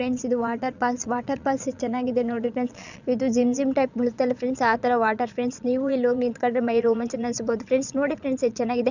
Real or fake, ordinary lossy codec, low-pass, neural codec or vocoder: fake; none; 7.2 kHz; vocoder, 22.05 kHz, 80 mel bands, Vocos